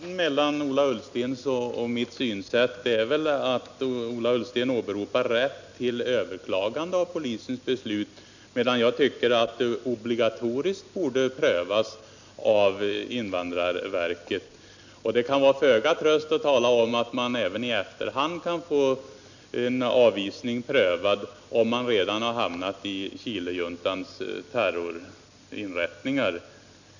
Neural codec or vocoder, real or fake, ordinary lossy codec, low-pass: none; real; none; 7.2 kHz